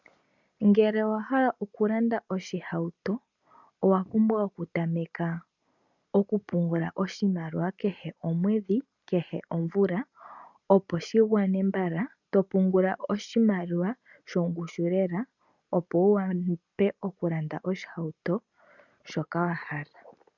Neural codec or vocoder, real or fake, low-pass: none; real; 7.2 kHz